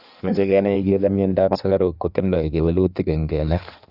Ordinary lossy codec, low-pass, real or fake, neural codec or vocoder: none; 5.4 kHz; fake; codec, 16 kHz in and 24 kHz out, 1.1 kbps, FireRedTTS-2 codec